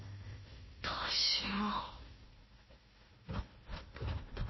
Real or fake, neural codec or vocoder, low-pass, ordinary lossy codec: fake; codec, 16 kHz, 1 kbps, FunCodec, trained on Chinese and English, 50 frames a second; 7.2 kHz; MP3, 24 kbps